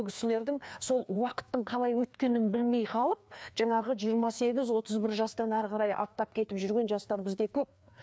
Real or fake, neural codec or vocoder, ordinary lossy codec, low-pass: fake; codec, 16 kHz, 2 kbps, FreqCodec, larger model; none; none